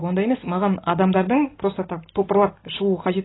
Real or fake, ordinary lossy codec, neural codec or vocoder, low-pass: real; AAC, 16 kbps; none; 7.2 kHz